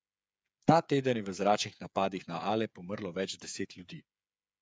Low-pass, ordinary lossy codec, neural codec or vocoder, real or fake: none; none; codec, 16 kHz, 8 kbps, FreqCodec, smaller model; fake